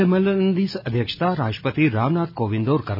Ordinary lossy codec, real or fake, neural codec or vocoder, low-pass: none; real; none; 5.4 kHz